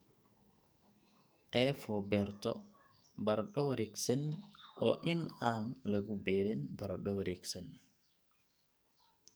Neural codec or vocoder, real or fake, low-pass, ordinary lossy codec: codec, 44.1 kHz, 2.6 kbps, SNAC; fake; none; none